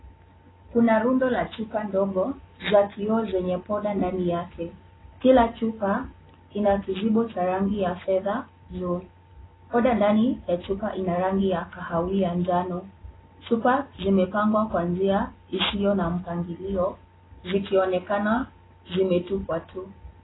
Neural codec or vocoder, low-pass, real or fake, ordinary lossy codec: none; 7.2 kHz; real; AAC, 16 kbps